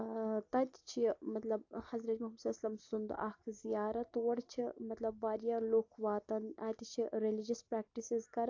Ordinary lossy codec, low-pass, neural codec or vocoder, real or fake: AAC, 48 kbps; 7.2 kHz; none; real